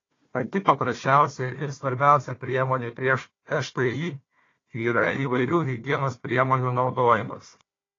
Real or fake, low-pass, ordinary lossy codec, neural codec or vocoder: fake; 7.2 kHz; AAC, 32 kbps; codec, 16 kHz, 1 kbps, FunCodec, trained on Chinese and English, 50 frames a second